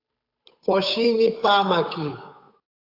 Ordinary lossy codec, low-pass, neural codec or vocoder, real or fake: AAC, 32 kbps; 5.4 kHz; codec, 16 kHz, 8 kbps, FunCodec, trained on Chinese and English, 25 frames a second; fake